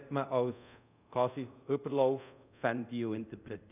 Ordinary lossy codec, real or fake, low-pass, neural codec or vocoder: none; fake; 3.6 kHz; codec, 24 kHz, 0.5 kbps, DualCodec